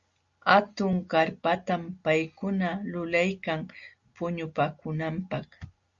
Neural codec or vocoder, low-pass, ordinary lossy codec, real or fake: none; 7.2 kHz; Opus, 64 kbps; real